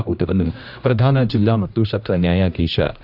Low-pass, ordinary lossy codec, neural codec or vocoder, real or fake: 5.4 kHz; none; codec, 16 kHz, 1 kbps, X-Codec, HuBERT features, trained on general audio; fake